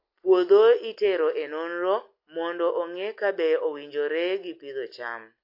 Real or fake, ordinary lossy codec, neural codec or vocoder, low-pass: real; MP3, 32 kbps; none; 5.4 kHz